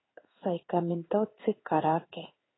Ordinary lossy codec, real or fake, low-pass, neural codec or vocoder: AAC, 16 kbps; fake; 7.2 kHz; codec, 16 kHz in and 24 kHz out, 1 kbps, XY-Tokenizer